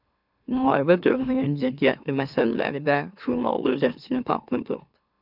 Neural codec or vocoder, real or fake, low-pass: autoencoder, 44.1 kHz, a latent of 192 numbers a frame, MeloTTS; fake; 5.4 kHz